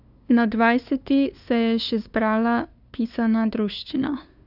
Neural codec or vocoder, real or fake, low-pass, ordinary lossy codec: codec, 16 kHz, 2 kbps, FunCodec, trained on LibriTTS, 25 frames a second; fake; 5.4 kHz; none